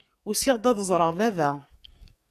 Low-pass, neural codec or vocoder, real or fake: 14.4 kHz; codec, 44.1 kHz, 2.6 kbps, SNAC; fake